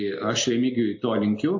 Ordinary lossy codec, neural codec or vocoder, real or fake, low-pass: MP3, 32 kbps; none; real; 7.2 kHz